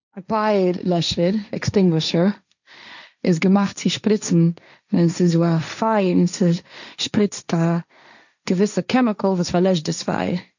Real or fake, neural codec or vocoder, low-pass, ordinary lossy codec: fake; codec, 16 kHz, 1.1 kbps, Voila-Tokenizer; 7.2 kHz; none